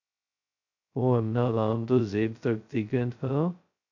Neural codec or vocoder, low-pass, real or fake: codec, 16 kHz, 0.2 kbps, FocalCodec; 7.2 kHz; fake